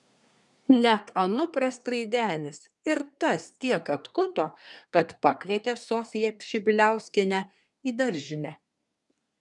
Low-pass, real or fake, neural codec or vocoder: 10.8 kHz; fake; codec, 24 kHz, 1 kbps, SNAC